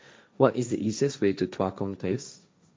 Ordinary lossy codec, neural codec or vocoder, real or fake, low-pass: none; codec, 16 kHz, 1.1 kbps, Voila-Tokenizer; fake; none